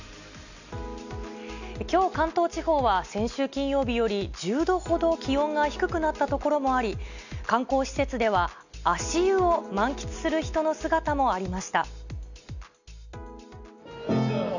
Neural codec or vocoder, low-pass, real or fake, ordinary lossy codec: none; 7.2 kHz; real; none